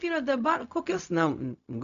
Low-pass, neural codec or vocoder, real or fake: 7.2 kHz; codec, 16 kHz, 0.4 kbps, LongCat-Audio-Codec; fake